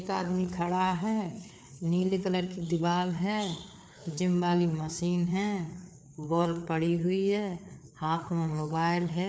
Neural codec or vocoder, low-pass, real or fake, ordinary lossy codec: codec, 16 kHz, 4 kbps, FreqCodec, larger model; none; fake; none